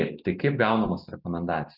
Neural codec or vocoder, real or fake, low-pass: none; real; 5.4 kHz